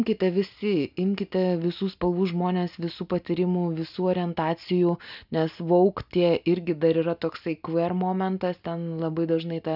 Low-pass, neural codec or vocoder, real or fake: 5.4 kHz; none; real